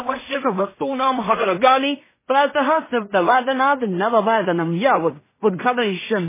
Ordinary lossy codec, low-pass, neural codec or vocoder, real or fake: MP3, 16 kbps; 3.6 kHz; codec, 16 kHz in and 24 kHz out, 0.4 kbps, LongCat-Audio-Codec, two codebook decoder; fake